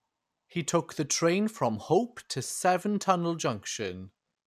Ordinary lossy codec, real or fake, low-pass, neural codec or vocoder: none; real; 14.4 kHz; none